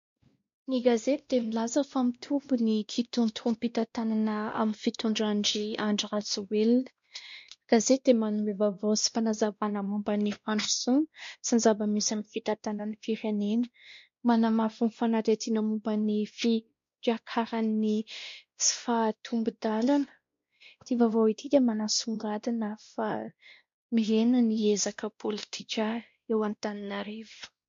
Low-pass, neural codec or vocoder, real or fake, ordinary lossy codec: 7.2 kHz; codec, 16 kHz, 1 kbps, X-Codec, WavLM features, trained on Multilingual LibriSpeech; fake; MP3, 48 kbps